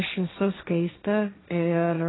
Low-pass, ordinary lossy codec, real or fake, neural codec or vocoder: 7.2 kHz; AAC, 16 kbps; fake; codec, 16 kHz, 1.1 kbps, Voila-Tokenizer